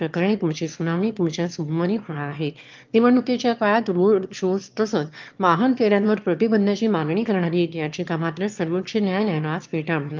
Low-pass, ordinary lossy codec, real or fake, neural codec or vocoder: 7.2 kHz; Opus, 24 kbps; fake; autoencoder, 22.05 kHz, a latent of 192 numbers a frame, VITS, trained on one speaker